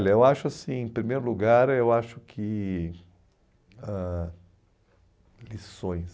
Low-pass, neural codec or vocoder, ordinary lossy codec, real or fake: none; none; none; real